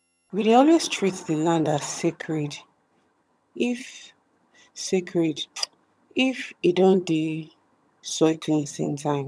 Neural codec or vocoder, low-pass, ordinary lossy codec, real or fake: vocoder, 22.05 kHz, 80 mel bands, HiFi-GAN; none; none; fake